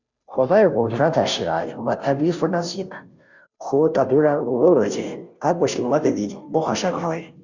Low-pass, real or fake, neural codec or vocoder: 7.2 kHz; fake; codec, 16 kHz, 0.5 kbps, FunCodec, trained on Chinese and English, 25 frames a second